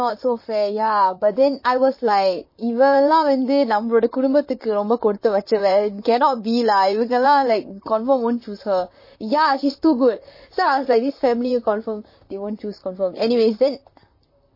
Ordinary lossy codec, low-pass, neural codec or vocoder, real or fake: MP3, 24 kbps; 5.4 kHz; vocoder, 44.1 kHz, 128 mel bands, Pupu-Vocoder; fake